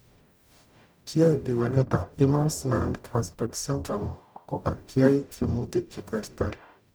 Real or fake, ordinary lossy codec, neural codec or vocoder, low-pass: fake; none; codec, 44.1 kHz, 0.9 kbps, DAC; none